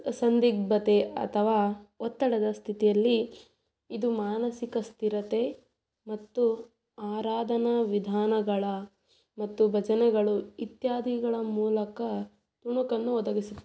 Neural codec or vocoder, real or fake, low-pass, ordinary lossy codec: none; real; none; none